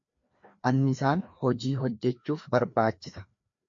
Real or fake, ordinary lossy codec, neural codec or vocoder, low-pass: fake; MP3, 48 kbps; codec, 16 kHz, 2 kbps, FreqCodec, larger model; 7.2 kHz